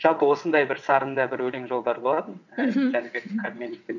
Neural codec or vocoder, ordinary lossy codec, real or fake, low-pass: vocoder, 22.05 kHz, 80 mel bands, WaveNeXt; none; fake; 7.2 kHz